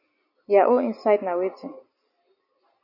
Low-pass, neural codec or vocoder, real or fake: 5.4 kHz; none; real